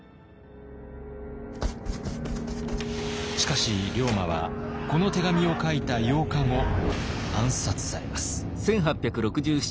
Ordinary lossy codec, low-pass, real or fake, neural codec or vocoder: none; none; real; none